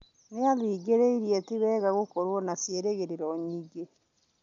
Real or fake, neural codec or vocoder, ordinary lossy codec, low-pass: real; none; none; 7.2 kHz